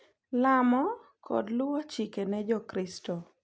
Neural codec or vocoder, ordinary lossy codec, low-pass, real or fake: none; none; none; real